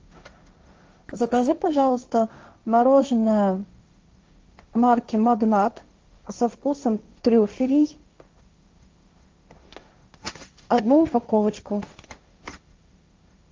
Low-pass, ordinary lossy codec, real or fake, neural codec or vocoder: 7.2 kHz; Opus, 24 kbps; fake; codec, 16 kHz, 1.1 kbps, Voila-Tokenizer